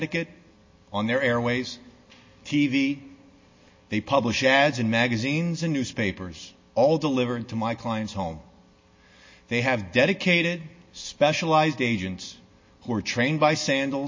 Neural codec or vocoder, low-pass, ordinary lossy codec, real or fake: none; 7.2 kHz; MP3, 32 kbps; real